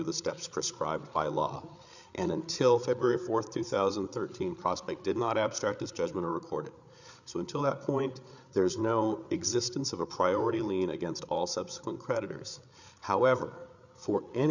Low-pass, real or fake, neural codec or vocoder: 7.2 kHz; fake; codec, 16 kHz, 8 kbps, FreqCodec, larger model